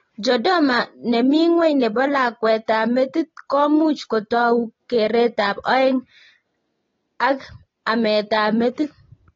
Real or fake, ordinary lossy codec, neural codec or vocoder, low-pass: real; AAC, 24 kbps; none; 7.2 kHz